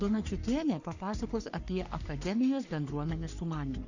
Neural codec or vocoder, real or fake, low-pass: codec, 44.1 kHz, 3.4 kbps, Pupu-Codec; fake; 7.2 kHz